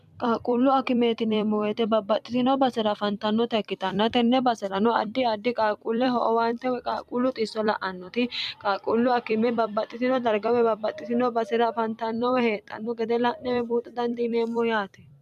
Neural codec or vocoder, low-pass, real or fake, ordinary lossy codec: vocoder, 44.1 kHz, 128 mel bands every 256 samples, BigVGAN v2; 14.4 kHz; fake; MP3, 96 kbps